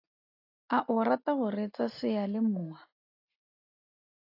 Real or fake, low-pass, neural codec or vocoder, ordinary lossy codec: real; 5.4 kHz; none; AAC, 32 kbps